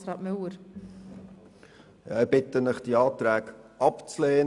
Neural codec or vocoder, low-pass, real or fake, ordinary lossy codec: none; 10.8 kHz; real; none